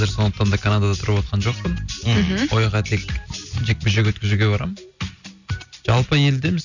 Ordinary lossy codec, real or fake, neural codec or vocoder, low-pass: none; real; none; 7.2 kHz